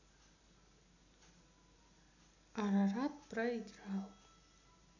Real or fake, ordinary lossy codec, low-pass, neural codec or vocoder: real; none; 7.2 kHz; none